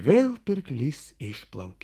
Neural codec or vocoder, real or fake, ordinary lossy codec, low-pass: codec, 44.1 kHz, 2.6 kbps, SNAC; fake; Opus, 32 kbps; 14.4 kHz